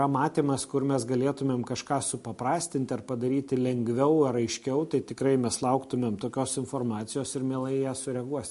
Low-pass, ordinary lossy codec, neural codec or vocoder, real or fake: 14.4 kHz; MP3, 48 kbps; none; real